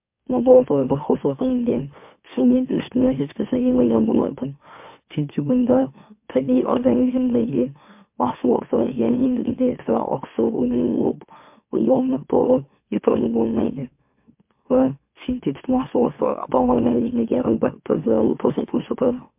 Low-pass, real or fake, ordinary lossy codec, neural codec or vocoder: 3.6 kHz; fake; MP3, 32 kbps; autoencoder, 44.1 kHz, a latent of 192 numbers a frame, MeloTTS